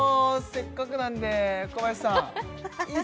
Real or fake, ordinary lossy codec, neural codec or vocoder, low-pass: real; none; none; none